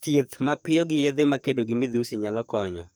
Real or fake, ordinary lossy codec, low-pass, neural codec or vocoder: fake; none; none; codec, 44.1 kHz, 2.6 kbps, SNAC